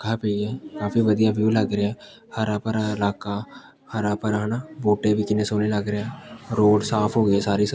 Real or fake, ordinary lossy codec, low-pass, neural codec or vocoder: real; none; none; none